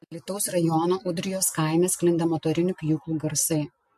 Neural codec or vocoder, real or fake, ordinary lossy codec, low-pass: none; real; MP3, 64 kbps; 14.4 kHz